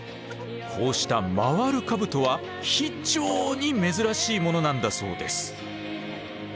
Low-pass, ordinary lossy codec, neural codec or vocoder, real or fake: none; none; none; real